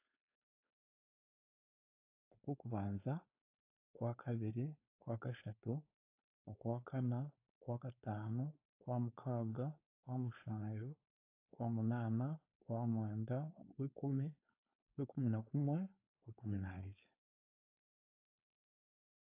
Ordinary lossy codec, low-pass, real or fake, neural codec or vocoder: AAC, 32 kbps; 3.6 kHz; fake; codec, 16 kHz, 4.8 kbps, FACodec